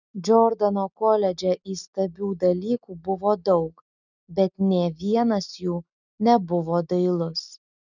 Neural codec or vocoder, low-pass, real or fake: none; 7.2 kHz; real